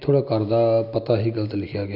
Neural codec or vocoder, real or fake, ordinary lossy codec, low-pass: none; real; none; 5.4 kHz